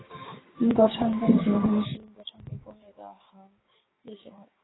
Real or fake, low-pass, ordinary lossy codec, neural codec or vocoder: fake; 7.2 kHz; AAC, 16 kbps; codec, 16 kHz, 6 kbps, DAC